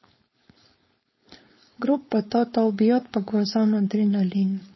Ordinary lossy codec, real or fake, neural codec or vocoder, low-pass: MP3, 24 kbps; fake; codec, 16 kHz, 4.8 kbps, FACodec; 7.2 kHz